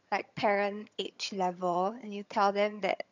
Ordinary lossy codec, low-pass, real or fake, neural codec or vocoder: none; 7.2 kHz; fake; vocoder, 22.05 kHz, 80 mel bands, HiFi-GAN